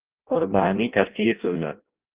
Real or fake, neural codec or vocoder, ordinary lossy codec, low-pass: fake; codec, 16 kHz in and 24 kHz out, 0.6 kbps, FireRedTTS-2 codec; Opus, 32 kbps; 3.6 kHz